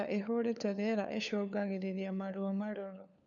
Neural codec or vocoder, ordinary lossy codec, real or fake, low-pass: codec, 16 kHz, 4 kbps, FunCodec, trained on LibriTTS, 50 frames a second; none; fake; 7.2 kHz